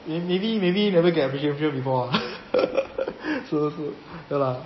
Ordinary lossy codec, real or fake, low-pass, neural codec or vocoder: MP3, 24 kbps; real; 7.2 kHz; none